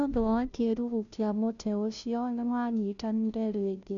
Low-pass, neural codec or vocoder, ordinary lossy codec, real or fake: 7.2 kHz; codec, 16 kHz, 0.5 kbps, FunCodec, trained on Chinese and English, 25 frames a second; none; fake